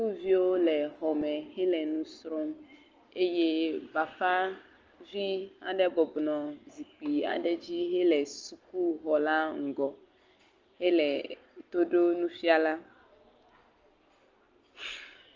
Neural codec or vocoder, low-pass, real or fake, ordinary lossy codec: none; 7.2 kHz; real; Opus, 24 kbps